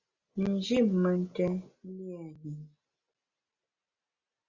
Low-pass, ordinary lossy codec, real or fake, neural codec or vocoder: 7.2 kHz; Opus, 64 kbps; real; none